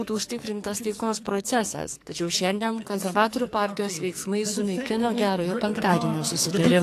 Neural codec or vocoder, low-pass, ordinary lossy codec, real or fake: codec, 44.1 kHz, 2.6 kbps, SNAC; 14.4 kHz; AAC, 64 kbps; fake